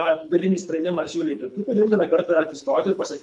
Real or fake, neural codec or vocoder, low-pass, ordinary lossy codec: fake; codec, 24 kHz, 3 kbps, HILCodec; 10.8 kHz; MP3, 64 kbps